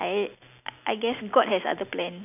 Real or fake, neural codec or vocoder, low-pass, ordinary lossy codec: real; none; 3.6 kHz; none